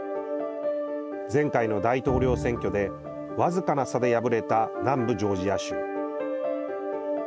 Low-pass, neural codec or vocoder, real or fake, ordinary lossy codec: none; none; real; none